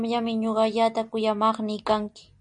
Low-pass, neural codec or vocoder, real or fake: 9.9 kHz; none; real